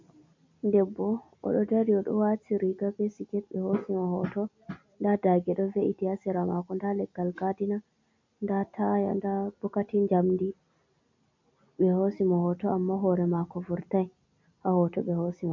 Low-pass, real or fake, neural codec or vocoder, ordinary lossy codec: 7.2 kHz; real; none; MP3, 48 kbps